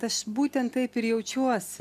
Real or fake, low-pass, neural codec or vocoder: real; 14.4 kHz; none